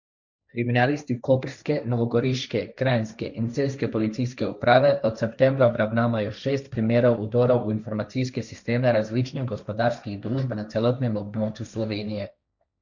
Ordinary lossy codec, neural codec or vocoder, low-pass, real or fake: none; codec, 16 kHz, 1.1 kbps, Voila-Tokenizer; 7.2 kHz; fake